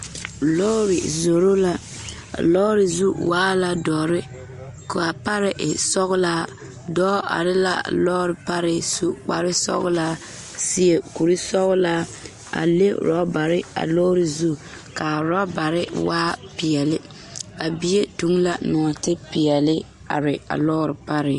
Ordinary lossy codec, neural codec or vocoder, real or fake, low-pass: MP3, 48 kbps; none; real; 14.4 kHz